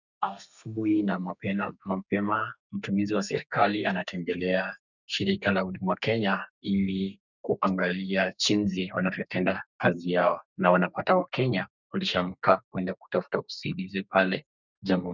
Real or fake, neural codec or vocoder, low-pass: fake; codec, 32 kHz, 1.9 kbps, SNAC; 7.2 kHz